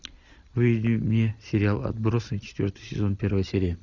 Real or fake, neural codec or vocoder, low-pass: real; none; 7.2 kHz